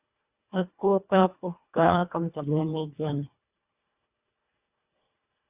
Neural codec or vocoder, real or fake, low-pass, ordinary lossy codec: codec, 24 kHz, 1.5 kbps, HILCodec; fake; 3.6 kHz; Opus, 64 kbps